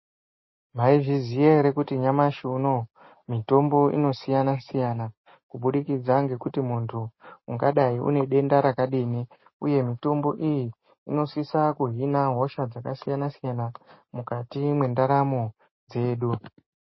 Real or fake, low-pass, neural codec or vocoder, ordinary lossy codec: real; 7.2 kHz; none; MP3, 24 kbps